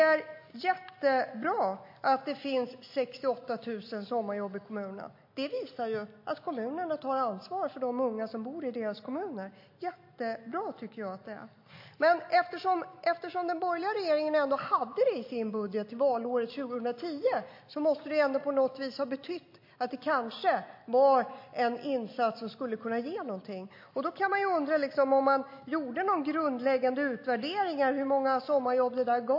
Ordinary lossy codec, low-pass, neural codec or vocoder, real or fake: MP3, 32 kbps; 5.4 kHz; none; real